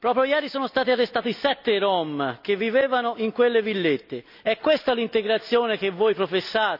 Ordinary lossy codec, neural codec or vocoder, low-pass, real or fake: none; none; 5.4 kHz; real